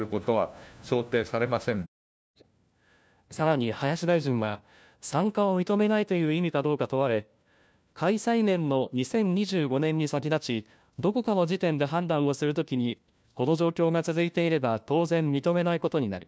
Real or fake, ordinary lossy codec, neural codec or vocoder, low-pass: fake; none; codec, 16 kHz, 1 kbps, FunCodec, trained on LibriTTS, 50 frames a second; none